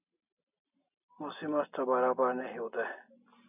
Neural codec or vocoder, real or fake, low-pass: none; real; 3.6 kHz